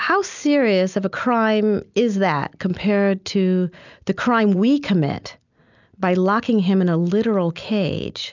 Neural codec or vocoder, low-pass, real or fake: none; 7.2 kHz; real